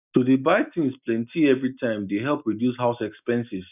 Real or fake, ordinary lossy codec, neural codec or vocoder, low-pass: real; none; none; 3.6 kHz